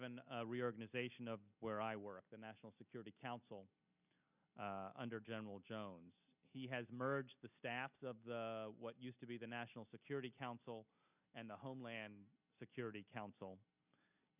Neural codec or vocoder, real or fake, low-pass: none; real; 3.6 kHz